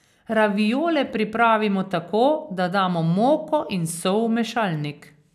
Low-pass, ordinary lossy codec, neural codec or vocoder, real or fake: 14.4 kHz; none; none; real